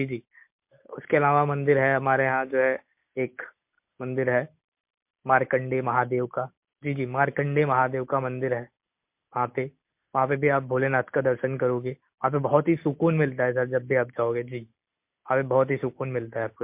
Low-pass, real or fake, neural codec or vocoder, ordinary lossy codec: 3.6 kHz; real; none; MP3, 32 kbps